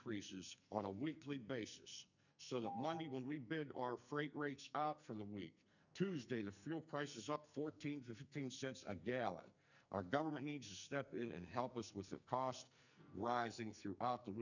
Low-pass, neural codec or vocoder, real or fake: 7.2 kHz; codec, 44.1 kHz, 2.6 kbps, SNAC; fake